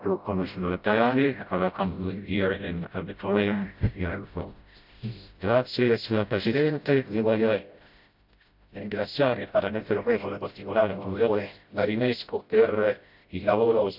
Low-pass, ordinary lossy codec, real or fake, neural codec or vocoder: 5.4 kHz; AAC, 48 kbps; fake; codec, 16 kHz, 0.5 kbps, FreqCodec, smaller model